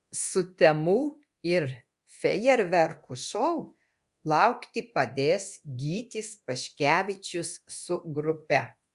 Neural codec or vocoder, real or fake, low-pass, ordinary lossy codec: codec, 24 kHz, 0.9 kbps, DualCodec; fake; 10.8 kHz; Opus, 64 kbps